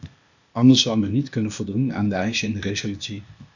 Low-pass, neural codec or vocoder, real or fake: 7.2 kHz; codec, 16 kHz, 0.8 kbps, ZipCodec; fake